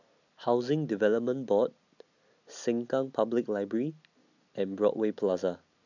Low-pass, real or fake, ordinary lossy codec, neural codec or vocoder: 7.2 kHz; real; none; none